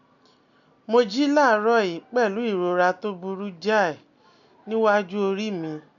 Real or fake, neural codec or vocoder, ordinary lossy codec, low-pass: real; none; none; 7.2 kHz